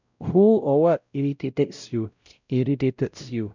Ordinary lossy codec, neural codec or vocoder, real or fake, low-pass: none; codec, 16 kHz, 0.5 kbps, X-Codec, WavLM features, trained on Multilingual LibriSpeech; fake; 7.2 kHz